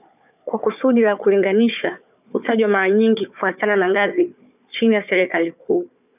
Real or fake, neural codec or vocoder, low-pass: fake; codec, 16 kHz, 4 kbps, FunCodec, trained on Chinese and English, 50 frames a second; 3.6 kHz